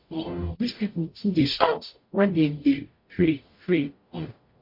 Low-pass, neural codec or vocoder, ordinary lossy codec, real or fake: 5.4 kHz; codec, 44.1 kHz, 0.9 kbps, DAC; MP3, 32 kbps; fake